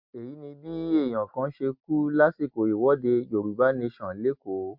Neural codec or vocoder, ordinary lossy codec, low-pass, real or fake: none; MP3, 48 kbps; 5.4 kHz; real